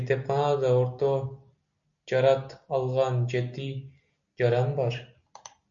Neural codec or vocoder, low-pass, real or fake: none; 7.2 kHz; real